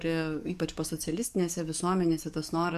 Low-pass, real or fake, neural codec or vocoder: 14.4 kHz; fake; codec, 44.1 kHz, 7.8 kbps, DAC